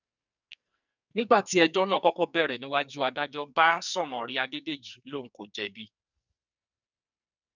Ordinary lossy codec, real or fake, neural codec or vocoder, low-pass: none; fake; codec, 44.1 kHz, 2.6 kbps, SNAC; 7.2 kHz